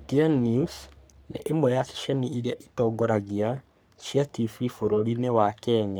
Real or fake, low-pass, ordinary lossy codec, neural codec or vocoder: fake; none; none; codec, 44.1 kHz, 3.4 kbps, Pupu-Codec